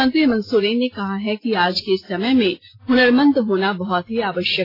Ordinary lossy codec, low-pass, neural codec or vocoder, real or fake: AAC, 24 kbps; 5.4 kHz; none; real